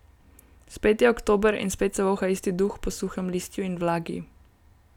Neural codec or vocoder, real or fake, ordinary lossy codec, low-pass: none; real; none; 19.8 kHz